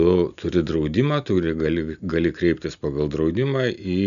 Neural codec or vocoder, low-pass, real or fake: none; 7.2 kHz; real